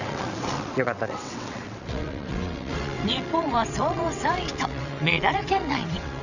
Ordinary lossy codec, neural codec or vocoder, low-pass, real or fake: none; vocoder, 22.05 kHz, 80 mel bands, WaveNeXt; 7.2 kHz; fake